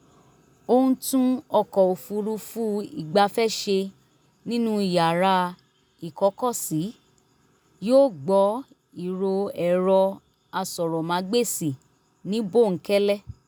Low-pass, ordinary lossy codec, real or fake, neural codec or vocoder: none; none; real; none